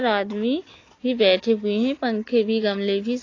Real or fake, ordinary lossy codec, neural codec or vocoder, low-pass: real; AAC, 32 kbps; none; 7.2 kHz